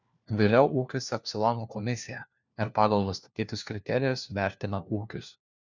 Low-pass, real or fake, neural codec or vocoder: 7.2 kHz; fake; codec, 16 kHz, 1 kbps, FunCodec, trained on LibriTTS, 50 frames a second